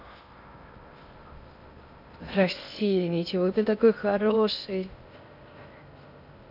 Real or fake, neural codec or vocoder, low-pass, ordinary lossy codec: fake; codec, 16 kHz in and 24 kHz out, 0.6 kbps, FocalCodec, streaming, 2048 codes; 5.4 kHz; none